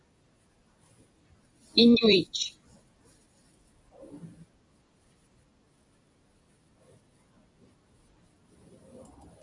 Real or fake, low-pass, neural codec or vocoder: fake; 10.8 kHz; vocoder, 44.1 kHz, 128 mel bands every 256 samples, BigVGAN v2